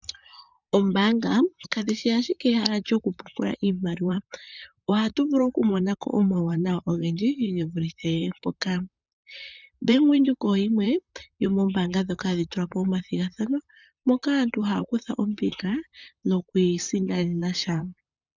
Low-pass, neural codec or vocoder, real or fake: 7.2 kHz; vocoder, 44.1 kHz, 128 mel bands, Pupu-Vocoder; fake